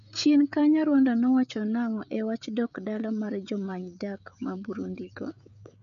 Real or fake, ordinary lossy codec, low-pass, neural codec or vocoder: fake; none; 7.2 kHz; codec, 16 kHz, 16 kbps, FreqCodec, smaller model